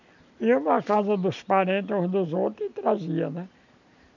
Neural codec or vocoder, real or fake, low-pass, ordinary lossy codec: none; real; 7.2 kHz; none